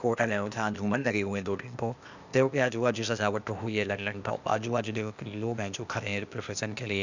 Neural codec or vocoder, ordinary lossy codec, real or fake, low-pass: codec, 16 kHz, 0.8 kbps, ZipCodec; none; fake; 7.2 kHz